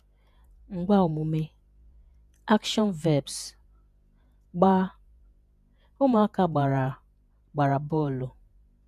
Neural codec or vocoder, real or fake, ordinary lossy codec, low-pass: vocoder, 48 kHz, 128 mel bands, Vocos; fake; none; 14.4 kHz